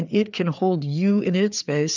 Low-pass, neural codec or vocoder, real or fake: 7.2 kHz; codec, 16 kHz, 4 kbps, FreqCodec, larger model; fake